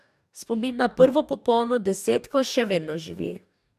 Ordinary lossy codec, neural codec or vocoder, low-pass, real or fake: none; codec, 44.1 kHz, 2.6 kbps, DAC; 14.4 kHz; fake